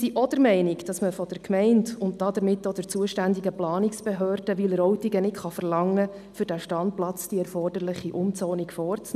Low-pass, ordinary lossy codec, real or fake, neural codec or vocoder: 14.4 kHz; none; real; none